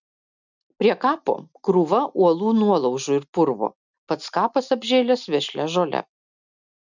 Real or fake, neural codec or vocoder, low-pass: real; none; 7.2 kHz